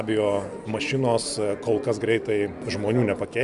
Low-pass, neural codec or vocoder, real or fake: 10.8 kHz; none; real